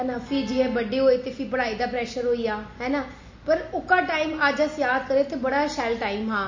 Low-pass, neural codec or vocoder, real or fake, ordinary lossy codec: 7.2 kHz; none; real; MP3, 32 kbps